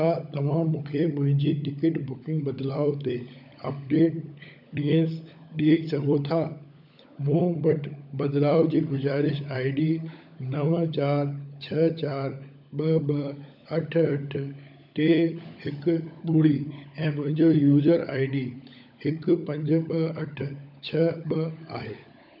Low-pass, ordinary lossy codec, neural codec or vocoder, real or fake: 5.4 kHz; none; codec, 16 kHz, 16 kbps, FunCodec, trained on LibriTTS, 50 frames a second; fake